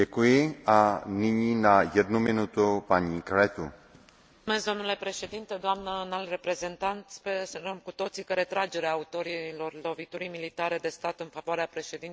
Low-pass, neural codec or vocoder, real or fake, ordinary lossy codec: none; none; real; none